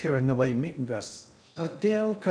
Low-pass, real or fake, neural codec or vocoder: 9.9 kHz; fake; codec, 16 kHz in and 24 kHz out, 0.6 kbps, FocalCodec, streaming, 2048 codes